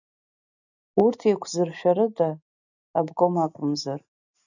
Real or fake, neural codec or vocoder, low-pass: real; none; 7.2 kHz